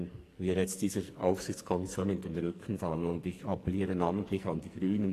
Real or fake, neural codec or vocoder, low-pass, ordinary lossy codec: fake; codec, 44.1 kHz, 2.6 kbps, SNAC; 14.4 kHz; AAC, 48 kbps